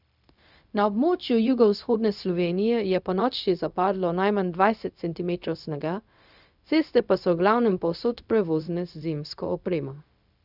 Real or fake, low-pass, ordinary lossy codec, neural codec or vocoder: fake; 5.4 kHz; none; codec, 16 kHz, 0.4 kbps, LongCat-Audio-Codec